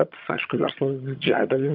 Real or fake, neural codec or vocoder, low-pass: fake; vocoder, 22.05 kHz, 80 mel bands, HiFi-GAN; 5.4 kHz